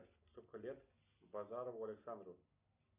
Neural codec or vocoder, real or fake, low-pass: none; real; 3.6 kHz